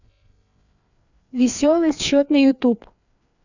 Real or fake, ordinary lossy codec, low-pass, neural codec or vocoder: fake; none; 7.2 kHz; codec, 16 kHz, 2 kbps, FreqCodec, larger model